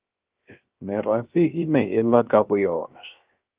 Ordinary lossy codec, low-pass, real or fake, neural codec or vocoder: Opus, 32 kbps; 3.6 kHz; fake; codec, 16 kHz, 0.3 kbps, FocalCodec